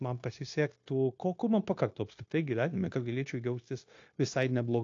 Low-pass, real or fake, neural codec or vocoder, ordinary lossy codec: 7.2 kHz; fake; codec, 16 kHz, 0.9 kbps, LongCat-Audio-Codec; AAC, 48 kbps